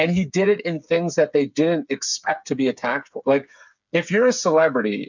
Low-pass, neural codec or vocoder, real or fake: 7.2 kHz; codec, 16 kHz, 8 kbps, FreqCodec, smaller model; fake